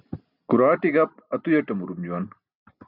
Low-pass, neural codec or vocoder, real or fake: 5.4 kHz; none; real